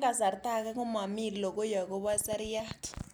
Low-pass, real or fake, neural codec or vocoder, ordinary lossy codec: none; real; none; none